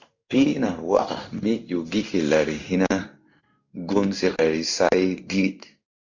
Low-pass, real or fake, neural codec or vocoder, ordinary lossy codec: 7.2 kHz; fake; codec, 16 kHz in and 24 kHz out, 1 kbps, XY-Tokenizer; Opus, 64 kbps